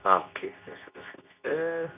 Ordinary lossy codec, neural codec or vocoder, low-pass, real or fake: none; codec, 24 kHz, 0.9 kbps, WavTokenizer, medium speech release version 2; 3.6 kHz; fake